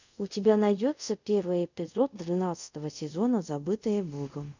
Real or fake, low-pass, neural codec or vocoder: fake; 7.2 kHz; codec, 24 kHz, 0.5 kbps, DualCodec